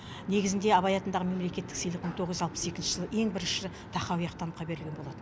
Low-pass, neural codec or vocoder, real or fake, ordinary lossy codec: none; none; real; none